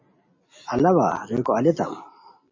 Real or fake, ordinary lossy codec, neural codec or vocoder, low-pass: real; MP3, 32 kbps; none; 7.2 kHz